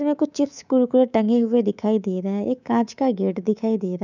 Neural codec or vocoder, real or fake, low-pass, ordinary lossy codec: codec, 24 kHz, 3.1 kbps, DualCodec; fake; 7.2 kHz; none